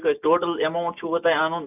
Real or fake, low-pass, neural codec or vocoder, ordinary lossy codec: real; 3.6 kHz; none; none